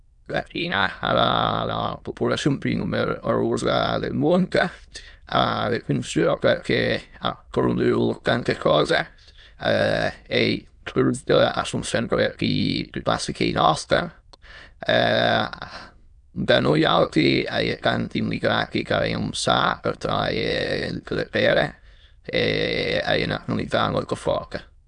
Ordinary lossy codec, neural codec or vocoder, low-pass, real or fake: none; autoencoder, 22.05 kHz, a latent of 192 numbers a frame, VITS, trained on many speakers; 9.9 kHz; fake